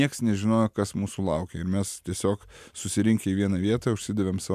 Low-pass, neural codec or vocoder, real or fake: 14.4 kHz; none; real